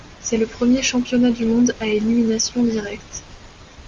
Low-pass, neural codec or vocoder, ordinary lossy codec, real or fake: 7.2 kHz; none; Opus, 32 kbps; real